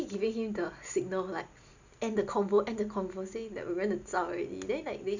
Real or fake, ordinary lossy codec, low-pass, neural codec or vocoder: real; none; 7.2 kHz; none